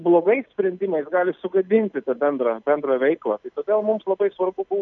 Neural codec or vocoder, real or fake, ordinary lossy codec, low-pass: none; real; MP3, 96 kbps; 7.2 kHz